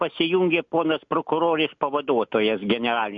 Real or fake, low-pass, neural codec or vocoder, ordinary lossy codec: real; 7.2 kHz; none; MP3, 48 kbps